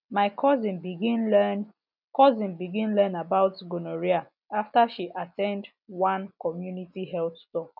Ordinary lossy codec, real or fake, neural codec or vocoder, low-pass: none; real; none; 5.4 kHz